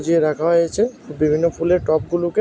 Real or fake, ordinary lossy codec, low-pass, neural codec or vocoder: real; none; none; none